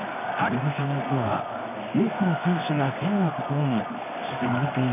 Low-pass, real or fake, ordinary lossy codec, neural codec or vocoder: 3.6 kHz; fake; none; codec, 24 kHz, 0.9 kbps, WavTokenizer, medium music audio release